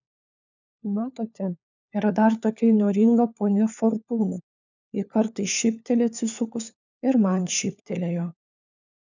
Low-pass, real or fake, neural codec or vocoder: 7.2 kHz; fake; codec, 16 kHz, 4 kbps, FunCodec, trained on LibriTTS, 50 frames a second